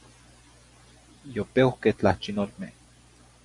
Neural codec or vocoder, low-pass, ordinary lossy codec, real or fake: none; 10.8 kHz; MP3, 64 kbps; real